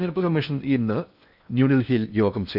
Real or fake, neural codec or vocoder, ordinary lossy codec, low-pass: fake; codec, 16 kHz in and 24 kHz out, 0.8 kbps, FocalCodec, streaming, 65536 codes; none; 5.4 kHz